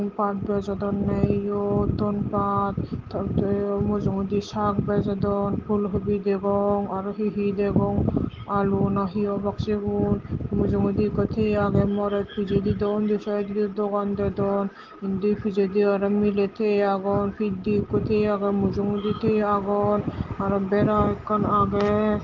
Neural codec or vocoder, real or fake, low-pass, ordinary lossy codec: none; real; 7.2 kHz; Opus, 16 kbps